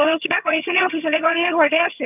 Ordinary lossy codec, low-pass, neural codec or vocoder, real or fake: none; 3.6 kHz; vocoder, 22.05 kHz, 80 mel bands, HiFi-GAN; fake